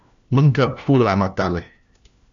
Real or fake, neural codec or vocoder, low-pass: fake; codec, 16 kHz, 1 kbps, FunCodec, trained on LibriTTS, 50 frames a second; 7.2 kHz